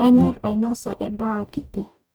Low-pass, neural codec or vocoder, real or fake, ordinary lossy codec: none; codec, 44.1 kHz, 0.9 kbps, DAC; fake; none